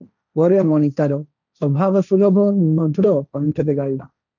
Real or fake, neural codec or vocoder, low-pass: fake; codec, 16 kHz, 1.1 kbps, Voila-Tokenizer; 7.2 kHz